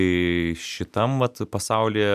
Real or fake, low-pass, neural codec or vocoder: real; 14.4 kHz; none